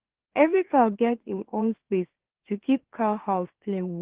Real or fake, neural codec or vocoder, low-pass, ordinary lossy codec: fake; autoencoder, 44.1 kHz, a latent of 192 numbers a frame, MeloTTS; 3.6 kHz; Opus, 16 kbps